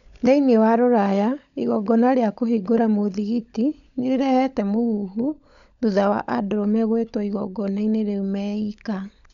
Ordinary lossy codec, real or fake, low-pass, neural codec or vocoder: none; fake; 7.2 kHz; codec, 16 kHz, 16 kbps, FunCodec, trained on LibriTTS, 50 frames a second